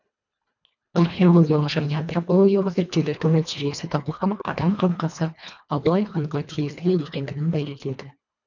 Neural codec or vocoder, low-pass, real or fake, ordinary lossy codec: codec, 24 kHz, 1.5 kbps, HILCodec; 7.2 kHz; fake; none